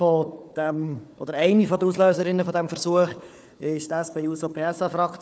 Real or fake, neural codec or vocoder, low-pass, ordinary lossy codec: fake; codec, 16 kHz, 4 kbps, FunCodec, trained on Chinese and English, 50 frames a second; none; none